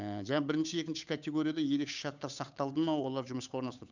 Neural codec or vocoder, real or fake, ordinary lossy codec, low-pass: codec, 16 kHz, 8 kbps, FunCodec, trained on Chinese and English, 25 frames a second; fake; none; 7.2 kHz